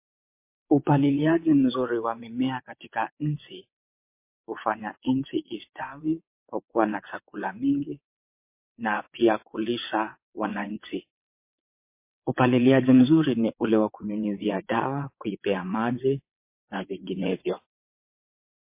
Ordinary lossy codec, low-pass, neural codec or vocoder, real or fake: MP3, 24 kbps; 3.6 kHz; vocoder, 22.05 kHz, 80 mel bands, WaveNeXt; fake